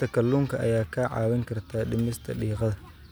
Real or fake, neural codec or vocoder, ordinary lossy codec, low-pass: real; none; none; none